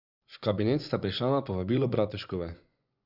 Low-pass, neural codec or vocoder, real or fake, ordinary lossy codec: 5.4 kHz; vocoder, 24 kHz, 100 mel bands, Vocos; fake; none